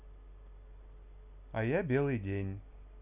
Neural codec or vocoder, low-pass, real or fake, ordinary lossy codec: none; 3.6 kHz; real; none